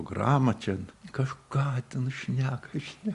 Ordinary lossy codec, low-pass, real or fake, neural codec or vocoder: Opus, 64 kbps; 10.8 kHz; real; none